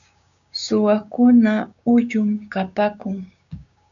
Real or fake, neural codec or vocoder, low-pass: fake; codec, 16 kHz, 6 kbps, DAC; 7.2 kHz